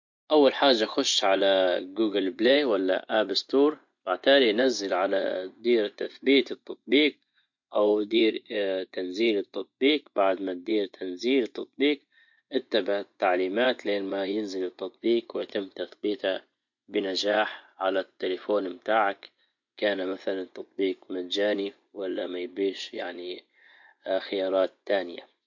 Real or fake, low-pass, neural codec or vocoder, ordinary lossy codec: fake; 7.2 kHz; vocoder, 24 kHz, 100 mel bands, Vocos; MP3, 48 kbps